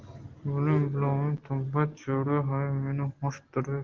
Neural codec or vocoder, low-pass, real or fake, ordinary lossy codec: none; 7.2 kHz; real; Opus, 16 kbps